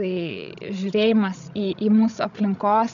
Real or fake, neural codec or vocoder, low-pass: fake; codec, 16 kHz, 16 kbps, FreqCodec, larger model; 7.2 kHz